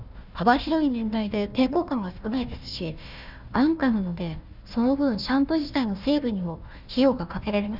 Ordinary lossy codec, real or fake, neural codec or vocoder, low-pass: none; fake; codec, 16 kHz, 1 kbps, FunCodec, trained on Chinese and English, 50 frames a second; 5.4 kHz